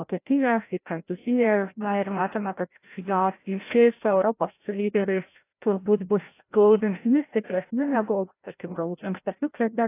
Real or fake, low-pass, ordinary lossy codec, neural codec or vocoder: fake; 3.6 kHz; AAC, 24 kbps; codec, 16 kHz, 0.5 kbps, FreqCodec, larger model